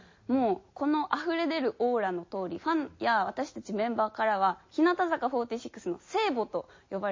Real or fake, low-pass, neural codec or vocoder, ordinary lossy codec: real; 7.2 kHz; none; MP3, 32 kbps